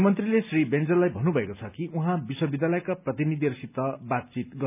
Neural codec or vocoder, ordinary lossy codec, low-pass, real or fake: none; none; 3.6 kHz; real